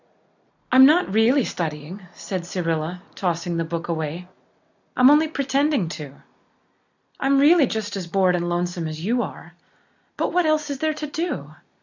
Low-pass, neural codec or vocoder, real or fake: 7.2 kHz; none; real